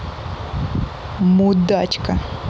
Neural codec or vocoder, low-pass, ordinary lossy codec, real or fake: none; none; none; real